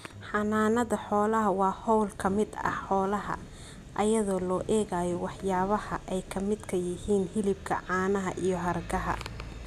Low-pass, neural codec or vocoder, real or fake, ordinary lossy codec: 14.4 kHz; none; real; none